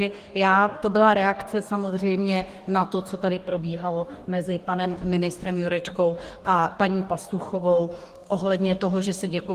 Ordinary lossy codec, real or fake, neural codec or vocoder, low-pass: Opus, 24 kbps; fake; codec, 44.1 kHz, 2.6 kbps, DAC; 14.4 kHz